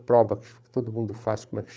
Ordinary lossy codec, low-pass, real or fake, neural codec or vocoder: none; none; fake; codec, 16 kHz, 8 kbps, FreqCodec, larger model